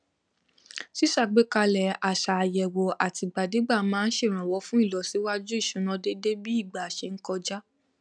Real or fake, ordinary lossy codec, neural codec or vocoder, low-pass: real; none; none; 9.9 kHz